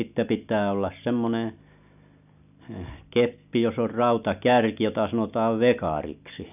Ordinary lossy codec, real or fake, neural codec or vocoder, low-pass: none; real; none; 3.6 kHz